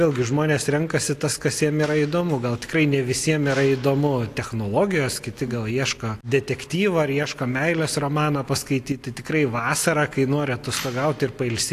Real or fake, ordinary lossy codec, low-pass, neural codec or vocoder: real; AAC, 64 kbps; 14.4 kHz; none